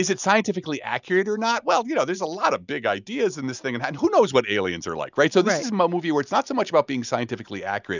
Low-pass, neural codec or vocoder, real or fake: 7.2 kHz; none; real